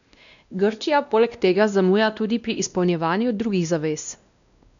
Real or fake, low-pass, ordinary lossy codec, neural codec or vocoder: fake; 7.2 kHz; none; codec, 16 kHz, 1 kbps, X-Codec, WavLM features, trained on Multilingual LibriSpeech